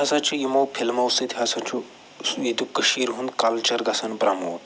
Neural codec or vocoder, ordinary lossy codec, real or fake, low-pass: none; none; real; none